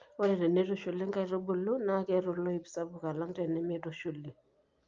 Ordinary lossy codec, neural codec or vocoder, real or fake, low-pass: Opus, 24 kbps; none; real; 7.2 kHz